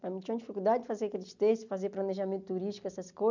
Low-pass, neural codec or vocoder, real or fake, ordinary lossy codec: 7.2 kHz; none; real; none